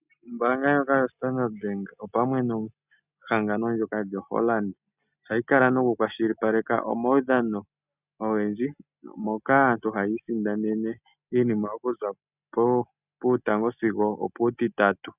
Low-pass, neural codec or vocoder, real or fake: 3.6 kHz; none; real